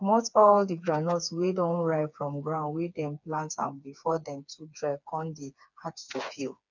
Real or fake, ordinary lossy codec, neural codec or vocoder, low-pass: fake; none; codec, 16 kHz, 4 kbps, FreqCodec, smaller model; 7.2 kHz